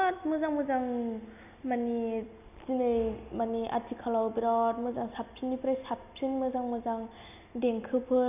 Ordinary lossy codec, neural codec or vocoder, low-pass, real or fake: none; none; 3.6 kHz; real